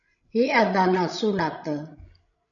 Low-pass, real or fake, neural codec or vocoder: 7.2 kHz; fake; codec, 16 kHz, 16 kbps, FreqCodec, larger model